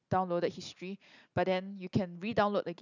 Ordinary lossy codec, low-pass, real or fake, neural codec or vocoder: none; 7.2 kHz; real; none